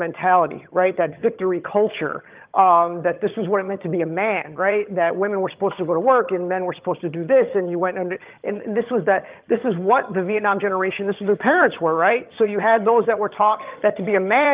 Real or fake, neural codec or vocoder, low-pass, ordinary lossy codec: fake; vocoder, 22.05 kHz, 80 mel bands, HiFi-GAN; 3.6 kHz; Opus, 32 kbps